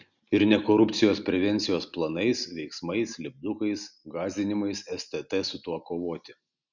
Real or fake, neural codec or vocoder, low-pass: real; none; 7.2 kHz